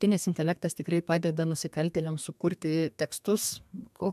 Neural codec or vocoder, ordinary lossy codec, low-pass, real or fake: codec, 32 kHz, 1.9 kbps, SNAC; MP3, 96 kbps; 14.4 kHz; fake